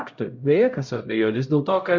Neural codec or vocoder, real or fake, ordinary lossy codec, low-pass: codec, 16 kHz, 0.5 kbps, X-Codec, HuBERT features, trained on LibriSpeech; fake; Opus, 64 kbps; 7.2 kHz